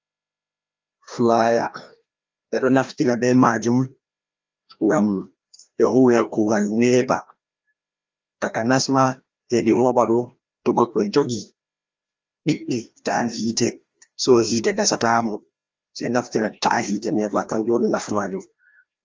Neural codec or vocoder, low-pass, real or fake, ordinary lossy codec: codec, 16 kHz, 1 kbps, FreqCodec, larger model; 7.2 kHz; fake; Opus, 24 kbps